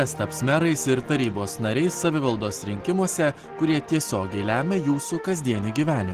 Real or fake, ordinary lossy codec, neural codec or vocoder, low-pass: real; Opus, 16 kbps; none; 14.4 kHz